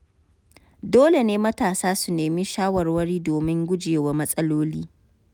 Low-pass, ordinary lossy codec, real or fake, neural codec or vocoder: none; none; real; none